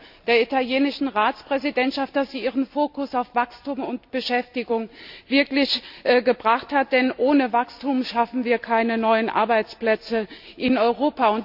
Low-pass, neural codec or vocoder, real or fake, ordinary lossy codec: 5.4 kHz; none; real; AAC, 48 kbps